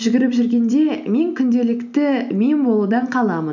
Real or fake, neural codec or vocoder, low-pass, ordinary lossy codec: real; none; 7.2 kHz; none